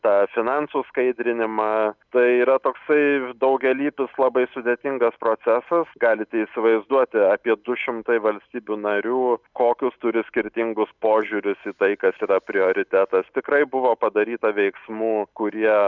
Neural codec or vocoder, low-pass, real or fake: none; 7.2 kHz; real